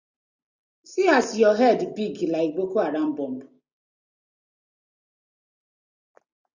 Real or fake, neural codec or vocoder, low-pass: real; none; 7.2 kHz